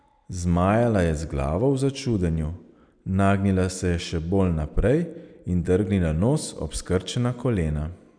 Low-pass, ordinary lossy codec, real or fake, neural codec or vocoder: 9.9 kHz; none; real; none